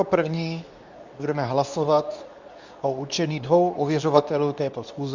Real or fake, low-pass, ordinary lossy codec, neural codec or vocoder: fake; 7.2 kHz; Opus, 64 kbps; codec, 24 kHz, 0.9 kbps, WavTokenizer, medium speech release version 2